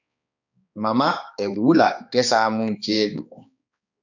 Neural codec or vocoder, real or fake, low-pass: codec, 16 kHz, 2 kbps, X-Codec, HuBERT features, trained on balanced general audio; fake; 7.2 kHz